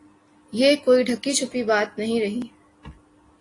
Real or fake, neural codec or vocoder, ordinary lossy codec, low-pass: real; none; AAC, 32 kbps; 10.8 kHz